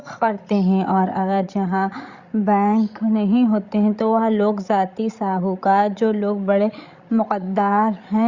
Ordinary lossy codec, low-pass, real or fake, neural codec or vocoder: Opus, 64 kbps; 7.2 kHz; fake; codec, 16 kHz, 16 kbps, FreqCodec, larger model